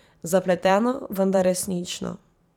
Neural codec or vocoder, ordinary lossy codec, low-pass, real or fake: vocoder, 44.1 kHz, 128 mel bands every 512 samples, BigVGAN v2; none; 19.8 kHz; fake